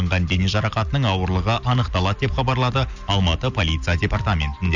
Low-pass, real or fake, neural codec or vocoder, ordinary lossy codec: 7.2 kHz; real; none; none